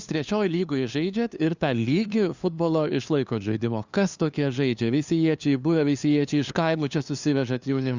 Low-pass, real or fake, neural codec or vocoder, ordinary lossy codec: 7.2 kHz; fake; codec, 16 kHz, 2 kbps, FunCodec, trained on LibriTTS, 25 frames a second; Opus, 64 kbps